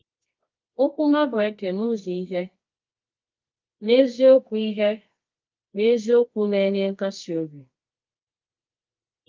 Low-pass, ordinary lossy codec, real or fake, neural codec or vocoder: 7.2 kHz; Opus, 24 kbps; fake; codec, 24 kHz, 0.9 kbps, WavTokenizer, medium music audio release